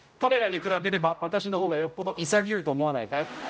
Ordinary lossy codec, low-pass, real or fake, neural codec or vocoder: none; none; fake; codec, 16 kHz, 0.5 kbps, X-Codec, HuBERT features, trained on general audio